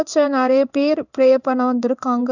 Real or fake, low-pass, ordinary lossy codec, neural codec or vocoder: fake; 7.2 kHz; none; codec, 16 kHz in and 24 kHz out, 1 kbps, XY-Tokenizer